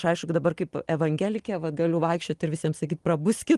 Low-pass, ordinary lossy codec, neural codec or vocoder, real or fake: 10.8 kHz; Opus, 24 kbps; none; real